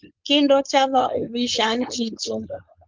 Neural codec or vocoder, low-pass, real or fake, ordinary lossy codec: codec, 16 kHz, 4.8 kbps, FACodec; 7.2 kHz; fake; Opus, 32 kbps